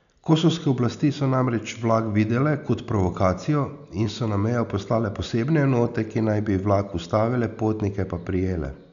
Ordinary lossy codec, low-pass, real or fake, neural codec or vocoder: none; 7.2 kHz; real; none